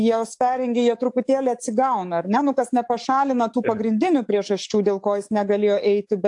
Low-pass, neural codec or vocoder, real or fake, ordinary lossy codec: 10.8 kHz; codec, 44.1 kHz, 7.8 kbps, DAC; fake; AAC, 64 kbps